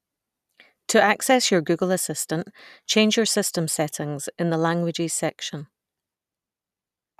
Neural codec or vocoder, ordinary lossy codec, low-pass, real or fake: none; none; 14.4 kHz; real